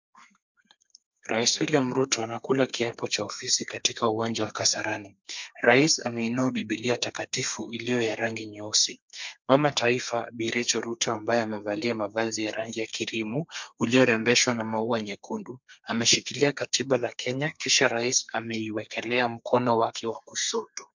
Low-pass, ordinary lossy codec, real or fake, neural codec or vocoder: 7.2 kHz; MP3, 64 kbps; fake; codec, 32 kHz, 1.9 kbps, SNAC